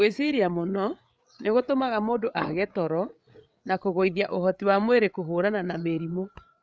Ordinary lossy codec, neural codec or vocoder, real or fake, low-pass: none; codec, 16 kHz, 8 kbps, FreqCodec, larger model; fake; none